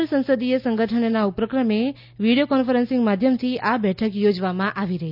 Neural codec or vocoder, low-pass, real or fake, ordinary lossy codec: none; 5.4 kHz; real; none